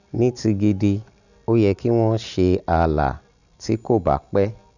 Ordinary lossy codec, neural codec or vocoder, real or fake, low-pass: none; none; real; 7.2 kHz